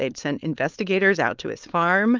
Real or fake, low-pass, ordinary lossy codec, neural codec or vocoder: fake; 7.2 kHz; Opus, 32 kbps; codec, 16 kHz, 4.8 kbps, FACodec